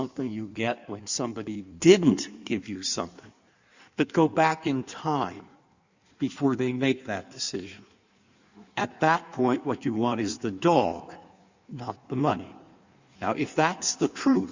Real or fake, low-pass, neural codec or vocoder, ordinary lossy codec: fake; 7.2 kHz; codec, 16 kHz in and 24 kHz out, 1.1 kbps, FireRedTTS-2 codec; Opus, 64 kbps